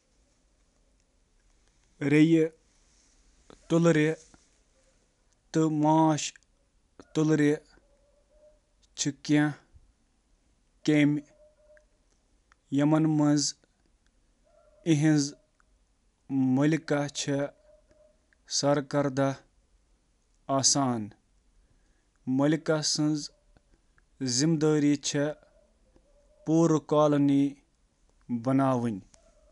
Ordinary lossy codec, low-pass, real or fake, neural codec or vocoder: none; 10.8 kHz; real; none